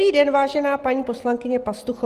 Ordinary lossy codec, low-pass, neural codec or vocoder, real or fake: Opus, 24 kbps; 14.4 kHz; none; real